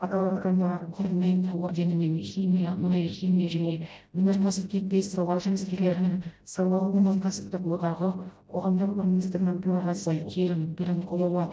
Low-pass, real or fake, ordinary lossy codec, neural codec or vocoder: none; fake; none; codec, 16 kHz, 0.5 kbps, FreqCodec, smaller model